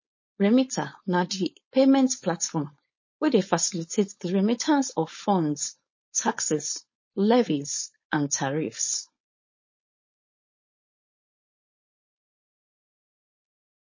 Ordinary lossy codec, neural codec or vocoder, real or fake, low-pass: MP3, 32 kbps; codec, 16 kHz, 4.8 kbps, FACodec; fake; 7.2 kHz